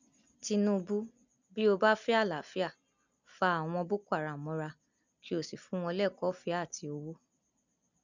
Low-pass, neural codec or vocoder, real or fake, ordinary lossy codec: 7.2 kHz; none; real; none